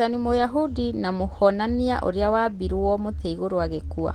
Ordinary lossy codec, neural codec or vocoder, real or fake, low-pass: Opus, 16 kbps; none; real; 19.8 kHz